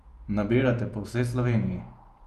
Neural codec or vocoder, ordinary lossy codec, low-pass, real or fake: vocoder, 48 kHz, 128 mel bands, Vocos; Opus, 32 kbps; 14.4 kHz; fake